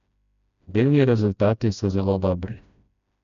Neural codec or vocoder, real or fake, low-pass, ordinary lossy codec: codec, 16 kHz, 1 kbps, FreqCodec, smaller model; fake; 7.2 kHz; none